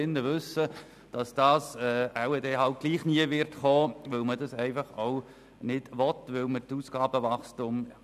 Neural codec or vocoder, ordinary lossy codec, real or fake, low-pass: none; none; real; 14.4 kHz